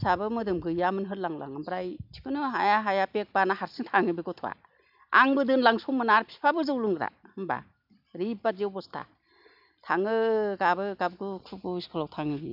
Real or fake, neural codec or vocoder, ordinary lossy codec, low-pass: real; none; none; 5.4 kHz